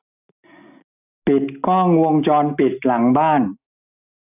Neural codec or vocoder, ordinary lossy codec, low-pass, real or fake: none; none; 3.6 kHz; real